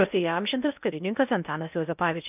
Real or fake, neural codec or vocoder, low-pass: fake; codec, 16 kHz in and 24 kHz out, 0.8 kbps, FocalCodec, streaming, 65536 codes; 3.6 kHz